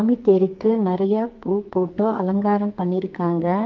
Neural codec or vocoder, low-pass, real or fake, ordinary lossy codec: codec, 44.1 kHz, 2.6 kbps, SNAC; 7.2 kHz; fake; Opus, 24 kbps